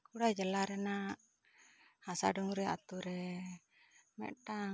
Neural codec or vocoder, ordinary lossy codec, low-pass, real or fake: none; none; none; real